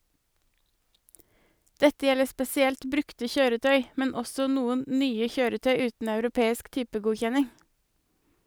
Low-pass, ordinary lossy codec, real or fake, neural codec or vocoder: none; none; real; none